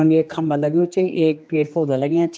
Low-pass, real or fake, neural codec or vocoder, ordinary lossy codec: none; fake; codec, 16 kHz, 2 kbps, X-Codec, HuBERT features, trained on general audio; none